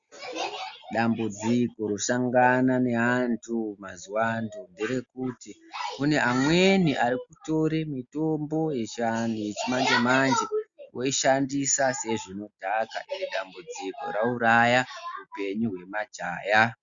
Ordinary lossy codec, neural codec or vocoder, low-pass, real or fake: Opus, 64 kbps; none; 7.2 kHz; real